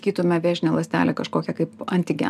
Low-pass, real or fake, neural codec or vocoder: 14.4 kHz; real; none